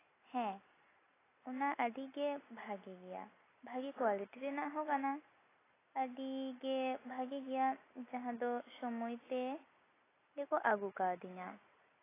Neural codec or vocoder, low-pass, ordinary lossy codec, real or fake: none; 3.6 kHz; AAC, 16 kbps; real